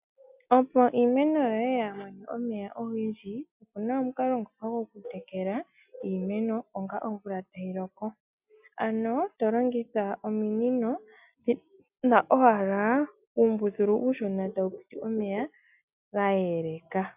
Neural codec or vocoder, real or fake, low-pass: none; real; 3.6 kHz